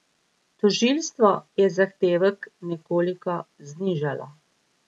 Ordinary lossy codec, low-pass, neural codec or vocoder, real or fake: none; none; none; real